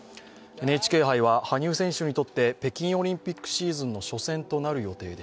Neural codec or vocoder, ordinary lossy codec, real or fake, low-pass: none; none; real; none